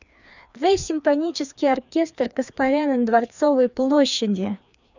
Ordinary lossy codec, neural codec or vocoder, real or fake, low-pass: none; codec, 16 kHz, 2 kbps, FreqCodec, larger model; fake; 7.2 kHz